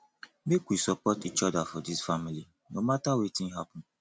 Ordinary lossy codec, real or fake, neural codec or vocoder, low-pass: none; real; none; none